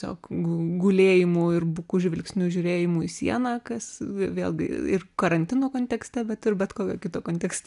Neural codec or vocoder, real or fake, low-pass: none; real; 10.8 kHz